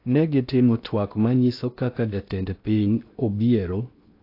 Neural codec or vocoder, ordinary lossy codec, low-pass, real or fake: codec, 16 kHz in and 24 kHz out, 0.6 kbps, FocalCodec, streaming, 2048 codes; AAC, 32 kbps; 5.4 kHz; fake